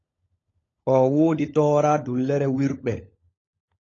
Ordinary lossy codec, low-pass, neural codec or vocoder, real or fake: AAC, 32 kbps; 7.2 kHz; codec, 16 kHz, 16 kbps, FunCodec, trained on LibriTTS, 50 frames a second; fake